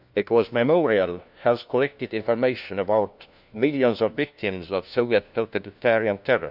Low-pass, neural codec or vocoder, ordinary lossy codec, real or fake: 5.4 kHz; codec, 16 kHz, 1 kbps, FunCodec, trained on LibriTTS, 50 frames a second; none; fake